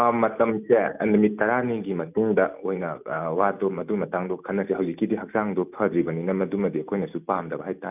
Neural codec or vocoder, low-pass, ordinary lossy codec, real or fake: none; 3.6 kHz; none; real